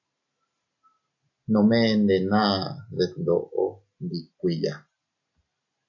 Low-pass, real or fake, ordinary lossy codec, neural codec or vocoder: 7.2 kHz; real; AAC, 48 kbps; none